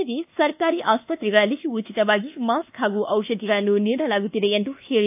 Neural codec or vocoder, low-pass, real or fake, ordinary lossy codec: codec, 24 kHz, 1.2 kbps, DualCodec; 3.6 kHz; fake; AAC, 32 kbps